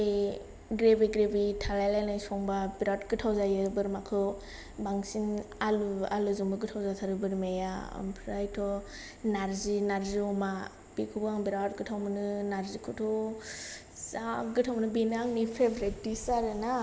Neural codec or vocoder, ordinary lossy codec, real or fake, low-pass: none; none; real; none